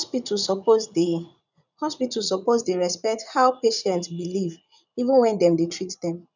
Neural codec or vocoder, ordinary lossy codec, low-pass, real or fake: vocoder, 44.1 kHz, 80 mel bands, Vocos; none; 7.2 kHz; fake